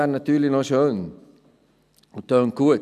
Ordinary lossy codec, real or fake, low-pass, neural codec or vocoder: none; real; 14.4 kHz; none